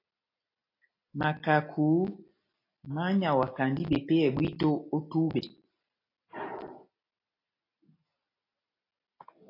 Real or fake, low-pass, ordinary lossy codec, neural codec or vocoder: real; 5.4 kHz; AAC, 32 kbps; none